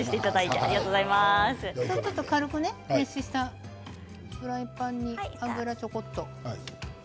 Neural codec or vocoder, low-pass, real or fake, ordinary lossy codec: none; none; real; none